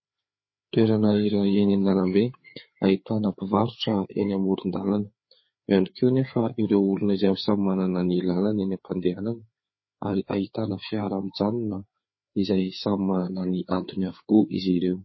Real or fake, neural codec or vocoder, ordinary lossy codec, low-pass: fake; codec, 16 kHz, 4 kbps, FreqCodec, larger model; MP3, 24 kbps; 7.2 kHz